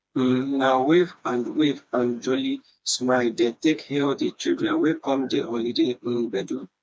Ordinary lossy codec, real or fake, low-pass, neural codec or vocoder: none; fake; none; codec, 16 kHz, 2 kbps, FreqCodec, smaller model